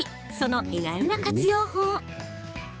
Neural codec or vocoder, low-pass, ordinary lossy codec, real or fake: codec, 16 kHz, 4 kbps, X-Codec, HuBERT features, trained on balanced general audio; none; none; fake